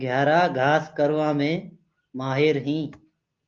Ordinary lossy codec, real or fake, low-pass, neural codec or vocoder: Opus, 24 kbps; real; 7.2 kHz; none